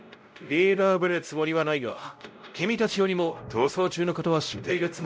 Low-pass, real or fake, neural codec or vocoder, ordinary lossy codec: none; fake; codec, 16 kHz, 0.5 kbps, X-Codec, WavLM features, trained on Multilingual LibriSpeech; none